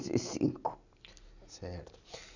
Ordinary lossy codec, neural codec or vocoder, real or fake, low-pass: none; none; real; 7.2 kHz